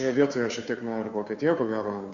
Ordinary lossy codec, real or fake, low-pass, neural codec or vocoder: MP3, 48 kbps; fake; 7.2 kHz; codec, 16 kHz, 2 kbps, FunCodec, trained on Chinese and English, 25 frames a second